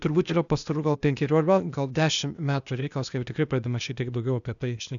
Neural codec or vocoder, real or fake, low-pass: codec, 16 kHz, 0.8 kbps, ZipCodec; fake; 7.2 kHz